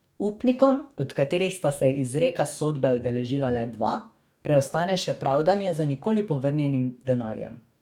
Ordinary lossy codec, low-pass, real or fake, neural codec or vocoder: none; 19.8 kHz; fake; codec, 44.1 kHz, 2.6 kbps, DAC